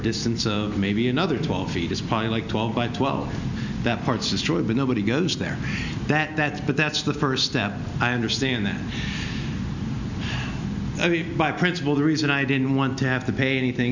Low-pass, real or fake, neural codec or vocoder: 7.2 kHz; real; none